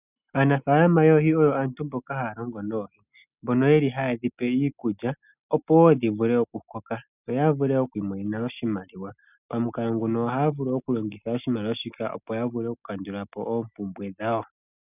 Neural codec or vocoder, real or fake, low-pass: none; real; 3.6 kHz